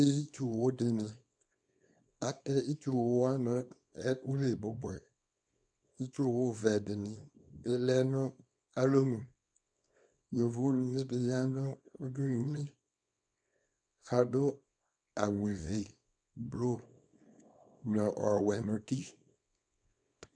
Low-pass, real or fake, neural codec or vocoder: 9.9 kHz; fake; codec, 24 kHz, 0.9 kbps, WavTokenizer, small release